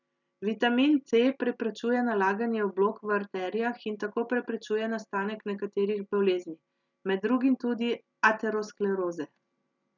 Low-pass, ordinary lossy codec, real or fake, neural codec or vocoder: 7.2 kHz; none; real; none